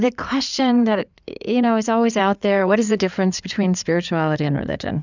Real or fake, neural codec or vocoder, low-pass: fake; codec, 16 kHz in and 24 kHz out, 2.2 kbps, FireRedTTS-2 codec; 7.2 kHz